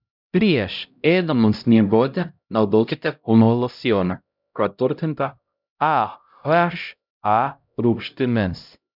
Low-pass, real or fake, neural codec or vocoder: 5.4 kHz; fake; codec, 16 kHz, 0.5 kbps, X-Codec, HuBERT features, trained on LibriSpeech